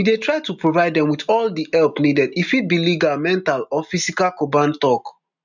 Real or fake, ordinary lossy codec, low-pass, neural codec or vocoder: real; none; 7.2 kHz; none